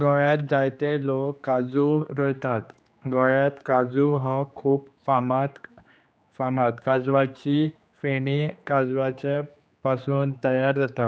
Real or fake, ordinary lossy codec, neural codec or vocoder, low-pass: fake; none; codec, 16 kHz, 2 kbps, X-Codec, HuBERT features, trained on general audio; none